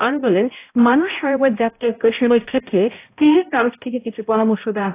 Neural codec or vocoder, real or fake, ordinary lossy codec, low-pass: codec, 16 kHz, 0.5 kbps, X-Codec, HuBERT features, trained on balanced general audio; fake; AAC, 24 kbps; 3.6 kHz